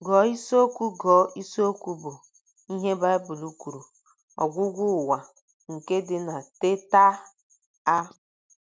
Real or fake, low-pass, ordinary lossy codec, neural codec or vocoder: real; none; none; none